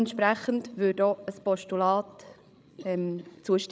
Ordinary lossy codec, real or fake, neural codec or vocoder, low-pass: none; fake; codec, 16 kHz, 16 kbps, FreqCodec, larger model; none